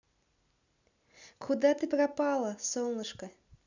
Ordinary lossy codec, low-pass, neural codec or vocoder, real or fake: none; 7.2 kHz; none; real